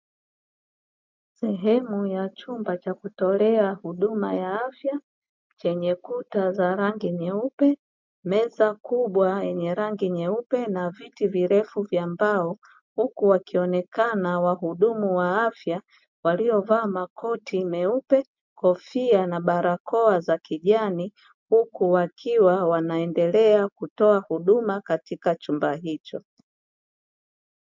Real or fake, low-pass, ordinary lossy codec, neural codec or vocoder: real; 7.2 kHz; MP3, 64 kbps; none